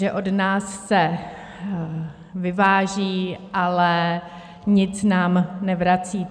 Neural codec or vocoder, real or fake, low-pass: none; real; 9.9 kHz